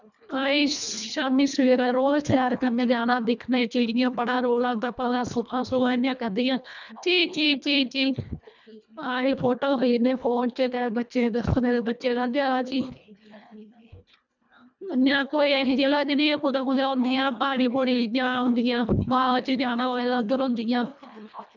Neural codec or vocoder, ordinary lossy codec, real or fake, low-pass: codec, 24 kHz, 1.5 kbps, HILCodec; none; fake; 7.2 kHz